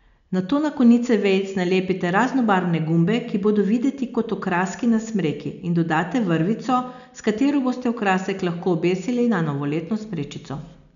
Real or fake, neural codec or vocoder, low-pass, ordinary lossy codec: real; none; 7.2 kHz; none